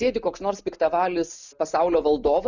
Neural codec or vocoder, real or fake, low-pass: none; real; 7.2 kHz